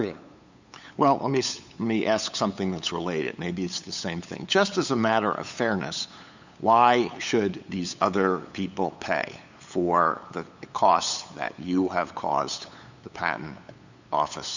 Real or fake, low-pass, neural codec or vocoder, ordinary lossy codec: fake; 7.2 kHz; codec, 16 kHz, 8 kbps, FunCodec, trained on LibriTTS, 25 frames a second; Opus, 64 kbps